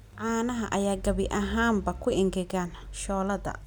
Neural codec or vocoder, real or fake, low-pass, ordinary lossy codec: none; real; none; none